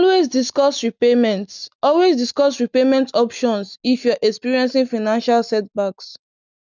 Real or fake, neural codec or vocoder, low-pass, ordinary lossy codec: real; none; 7.2 kHz; none